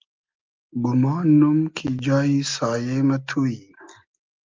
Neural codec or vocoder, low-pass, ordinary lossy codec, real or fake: none; 7.2 kHz; Opus, 24 kbps; real